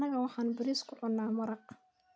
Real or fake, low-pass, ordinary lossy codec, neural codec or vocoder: real; none; none; none